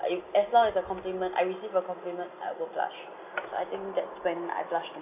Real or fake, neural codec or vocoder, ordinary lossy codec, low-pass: real; none; none; 3.6 kHz